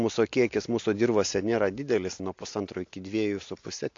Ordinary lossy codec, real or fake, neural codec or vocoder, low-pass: AAC, 48 kbps; real; none; 7.2 kHz